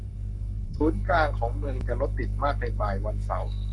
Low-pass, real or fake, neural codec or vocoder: 10.8 kHz; fake; codec, 44.1 kHz, 7.8 kbps, Pupu-Codec